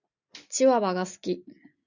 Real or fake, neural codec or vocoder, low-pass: real; none; 7.2 kHz